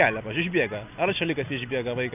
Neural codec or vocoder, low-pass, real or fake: none; 3.6 kHz; real